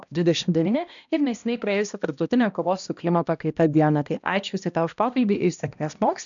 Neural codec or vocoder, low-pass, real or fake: codec, 16 kHz, 1 kbps, X-Codec, HuBERT features, trained on balanced general audio; 7.2 kHz; fake